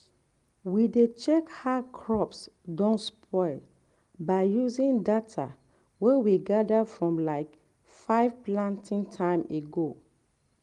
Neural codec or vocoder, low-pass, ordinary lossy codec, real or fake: none; 10.8 kHz; Opus, 24 kbps; real